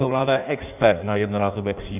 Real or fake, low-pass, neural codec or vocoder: fake; 3.6 kHz; codec, 16 kHz in and 24 kHz out, 1.1 kbps, FireRedTTS-2 codec